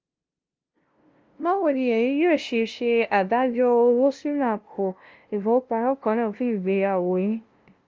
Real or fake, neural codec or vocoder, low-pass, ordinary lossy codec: fake; codec, 16 kHz, 0.5 kbps, FunCodec, trained on LibriTTS, 25 frames a second; 7.2 kHz; Opus, 24 kbps